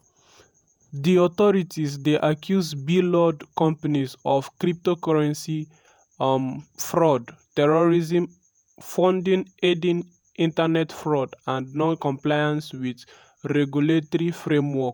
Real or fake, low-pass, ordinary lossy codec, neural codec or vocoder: fake; none; none; vocoder, 48 kHz, 128 mel bands, Vocos